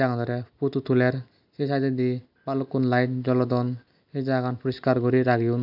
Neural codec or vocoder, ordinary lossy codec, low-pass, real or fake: none; AAC, 48 kbps; 5.4 kHz; real